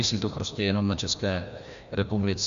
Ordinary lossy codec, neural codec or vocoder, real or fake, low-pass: Opus, 64 kbps; codec, 16 kHz, 1 kbps, FreqCodec, larger model; fake; 7.2 kHz